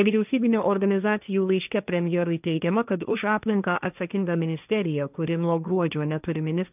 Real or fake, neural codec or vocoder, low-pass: fake; codec, 16 kHz, 1.1 kbps, Voila-Tokenizer; 3.6 kHz